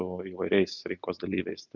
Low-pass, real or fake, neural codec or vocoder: 7.2 kHz; real; none